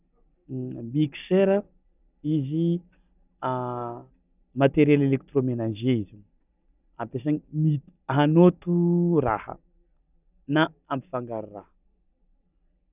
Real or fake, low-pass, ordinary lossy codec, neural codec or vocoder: real; 3.6 kHz; none; none